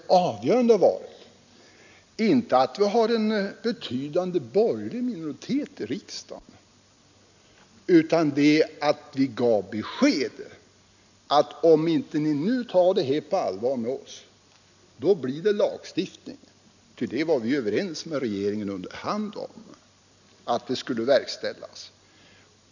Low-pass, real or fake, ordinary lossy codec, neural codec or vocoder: 7.2 kHz; real; none; none